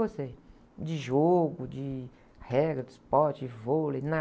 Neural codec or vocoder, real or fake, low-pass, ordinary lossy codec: none; real; none; none